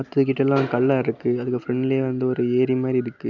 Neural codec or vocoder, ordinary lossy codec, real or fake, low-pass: none; none; real; 7.2 kHz